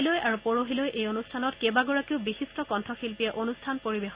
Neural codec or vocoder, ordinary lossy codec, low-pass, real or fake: none; Opus, 64 kbps; 3.6 kHz; real